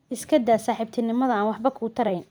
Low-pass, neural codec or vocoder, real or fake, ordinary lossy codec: none; vocoder, 44.1 kHz, 128 mel bands every 512 samples, BigVGAN v2; fake; none